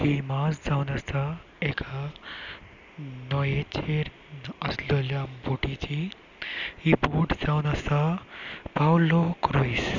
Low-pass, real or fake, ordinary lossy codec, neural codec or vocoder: 7.2 kHz; real; none; none